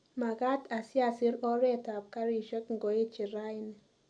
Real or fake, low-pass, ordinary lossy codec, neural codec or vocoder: real; 9.9 kHz; none; none